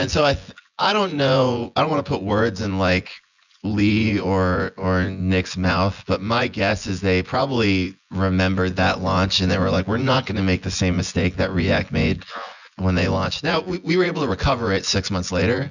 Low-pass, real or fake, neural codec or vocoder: 7.2 kHz; fake; vocoder, 24 kHz, 100 mel bands, Vocos